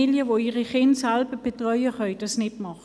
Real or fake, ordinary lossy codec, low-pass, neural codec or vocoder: real; none; none; none